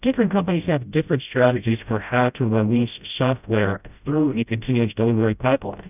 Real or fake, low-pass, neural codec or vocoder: fake; 3.6 kHz; codec, 16 kHz, 0.5 kbps, FreqCodec, smaller model